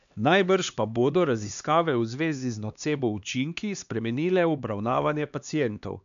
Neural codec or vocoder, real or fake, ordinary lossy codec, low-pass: codec, 16 kHz, 2 kbps, X-Codec, HuBERT features, trained on LibriSpeech; fake; none; 7.2 kHz